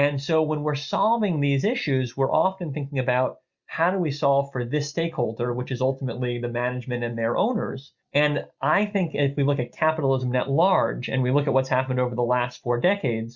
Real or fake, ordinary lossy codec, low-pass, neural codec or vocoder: real; Opus, 64 kbps; 7.2 kHz; none